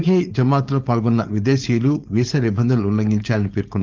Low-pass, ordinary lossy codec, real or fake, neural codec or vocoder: 7.2 kHz; Opus, 24 kbps; fake; codec, 16 kHz, 4.8 kbps, FACodec